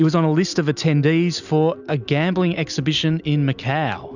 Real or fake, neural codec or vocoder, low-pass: real; none; 7.2 kHz